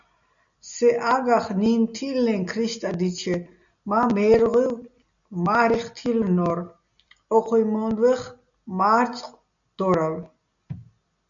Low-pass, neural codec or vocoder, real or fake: 7.2 kHz; none; real